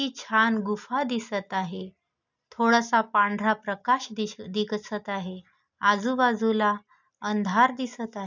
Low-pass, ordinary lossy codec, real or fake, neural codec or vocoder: 7.2 kHz; none; real; none